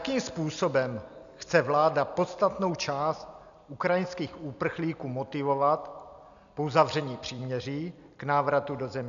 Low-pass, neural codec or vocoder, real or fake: 7.2 kHz; none; real